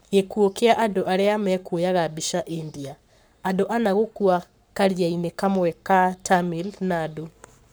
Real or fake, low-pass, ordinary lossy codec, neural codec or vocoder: fake; none; none; codec, 44.1 kHz, 7.8 kbps, Pupu-Codec